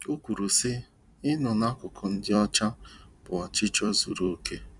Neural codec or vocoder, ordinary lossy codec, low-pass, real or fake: vocoder, 44.1 kHz, 128 mel bands every 256 samples, BigVGAN v2; none; 10.8 kHz; fake